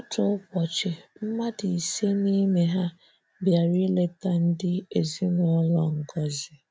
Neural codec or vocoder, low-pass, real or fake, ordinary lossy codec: none; none; real; none